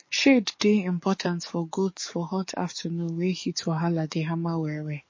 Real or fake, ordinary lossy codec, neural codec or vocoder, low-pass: fake; MP3, 32 kbps; codec, 44.1 kHz, 7.8 kbps, DAC; 7.2 kHz